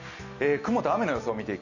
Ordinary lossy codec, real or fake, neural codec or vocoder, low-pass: none; real; none; 7.2 kHz